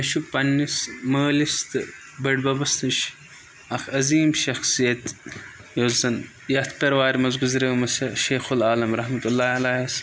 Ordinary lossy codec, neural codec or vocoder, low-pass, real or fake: none; none; none; real